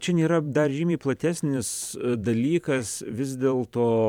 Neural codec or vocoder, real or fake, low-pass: vocoder, 44.1 kHz, 128 mel bands every 512 samples, BigVGAN v2; fake; 19.8 kHz